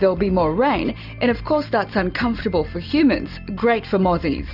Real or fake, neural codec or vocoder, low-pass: real; none; 5.4 kHz